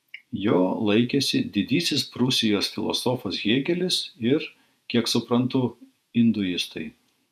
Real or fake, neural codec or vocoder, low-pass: fake; vocoder, 48 kHz, 128 mel bands, Vocos; 14.4 kHz